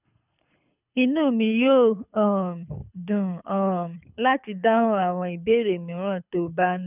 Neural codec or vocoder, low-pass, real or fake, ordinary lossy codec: codec, 24 kHz, 6 kbps, HILCodec; 3.6 kHz; fake; none